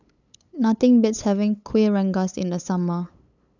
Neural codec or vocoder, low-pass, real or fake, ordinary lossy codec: codec, 16 kHz, 8 kbps, FunCodec, trained on LibriTTS, 25 frames a second; 7.2 kHz; fake; none